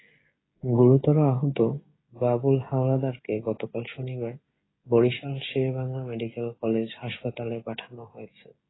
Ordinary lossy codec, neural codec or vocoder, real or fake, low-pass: AAC, 16 kbps; codec, 16 kHz, 16 kbps, FreqCodec, smaller model; fake; 7.2 kHz